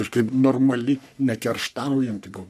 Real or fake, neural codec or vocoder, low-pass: fake; codec, 44.1 kHz, 3.4 kbps, Pupu-Codec; 14.4 kHz